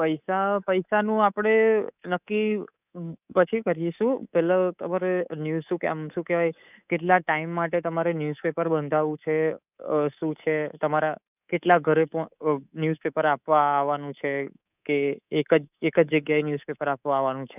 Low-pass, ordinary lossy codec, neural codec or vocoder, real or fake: 3.6 kHz; none; codec, 24 kHz, 3.1 kbps, DualCodec; fake